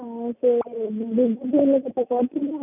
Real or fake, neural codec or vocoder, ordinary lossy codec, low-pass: real; none; none; 3.6 kHz